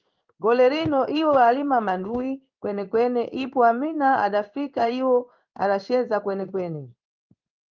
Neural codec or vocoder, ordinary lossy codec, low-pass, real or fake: codec, 16 kHz in and 24 kHz out, 1 kbps, XY-Tokenizer; Opus, 24 kbps; 7.2 kHz; fake